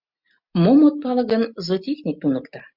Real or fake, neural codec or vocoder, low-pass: real; none; 5.4 kHz